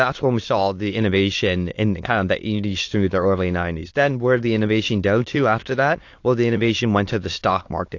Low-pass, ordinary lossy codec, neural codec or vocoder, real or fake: 7.2 kHz; AAC, 48 kbps; autoencoder, 22.05 kHz, a latent of 192 numbers a frame, VITS, trained on many speakers; fake